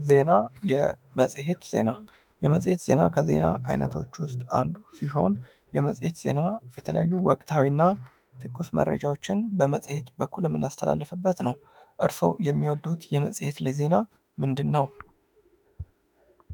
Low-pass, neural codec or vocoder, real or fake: 19.8 kHz; autoencoder, 48 kHz, 32 numbers a frame, DAC-VAE, trained on Japanese speech; fake